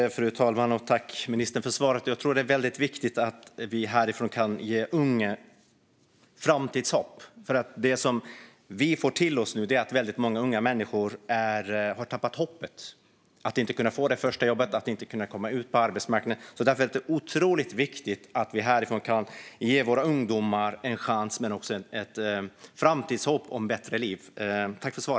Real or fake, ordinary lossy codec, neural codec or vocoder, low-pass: real; none; none; none